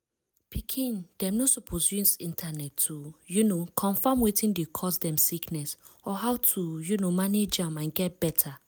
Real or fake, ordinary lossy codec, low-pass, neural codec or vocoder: real; none; none; none